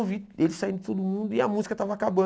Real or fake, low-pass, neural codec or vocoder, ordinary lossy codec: real; none; none; none